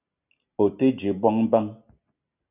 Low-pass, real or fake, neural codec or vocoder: 3.6 kHz; real; none